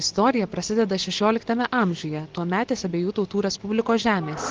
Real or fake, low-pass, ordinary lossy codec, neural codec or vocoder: real; 7.2 kHz; Opus, 16 kbps; none